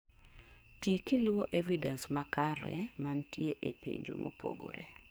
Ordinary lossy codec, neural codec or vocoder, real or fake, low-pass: none; codec, 44.1 kHz, 2.6 kbps, SNAC; fake; none